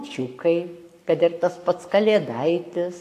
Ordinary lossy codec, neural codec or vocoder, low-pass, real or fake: AAC, 64 kbps; codec, 44.1 kHz, 7.8 kbps, Pupu-Codec; 14.4 kHz; fake